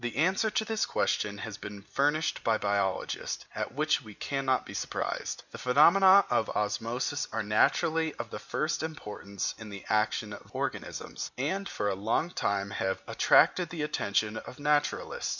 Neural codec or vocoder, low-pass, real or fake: none; 7.2 kHz; real